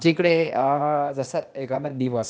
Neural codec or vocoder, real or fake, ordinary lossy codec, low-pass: codec, 16 kHz, 0.8 kbps, ZipCodec; fake; none; none